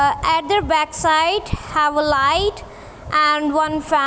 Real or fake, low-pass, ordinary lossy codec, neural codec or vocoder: real; none; none; none